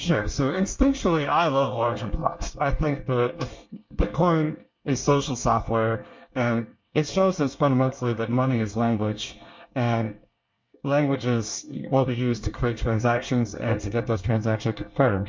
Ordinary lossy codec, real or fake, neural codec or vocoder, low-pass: MP3, 48 kbps; fake; codec, 24 kHz, 1 kbps, SNAC; 7.2 kHz